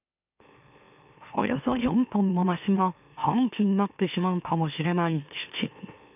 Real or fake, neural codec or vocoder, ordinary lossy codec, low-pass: fake; autoencoder, 44.1 kHz, a latent of 192 numbers a frame, MeloTTS; none; 3.6 kHz